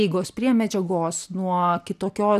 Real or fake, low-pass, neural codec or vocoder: real; 14.4 kHz; none